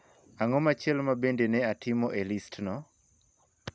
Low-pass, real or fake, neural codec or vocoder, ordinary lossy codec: none; real; none; none